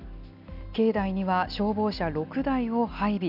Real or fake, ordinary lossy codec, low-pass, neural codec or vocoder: real; none; 5.4 kHz; none